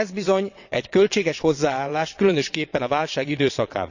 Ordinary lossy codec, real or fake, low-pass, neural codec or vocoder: none; fake; 7.2 kHz; vocoder, 22.05 kHz, 80 mel bands, WaveNeXt